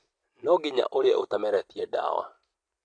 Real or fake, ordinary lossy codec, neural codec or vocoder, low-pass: real; AAC, 48 kbps; none; 9.9 kHz